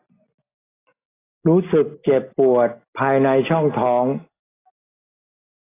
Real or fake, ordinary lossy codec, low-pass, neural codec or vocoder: real; AAC, 16 kbps; 3.6 kHz; none